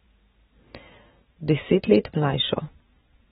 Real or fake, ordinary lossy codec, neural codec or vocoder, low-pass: real; AAC, 16 kbps; none; 19.8 kHz